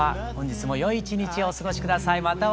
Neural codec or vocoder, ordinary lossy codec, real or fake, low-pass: none; none; real; none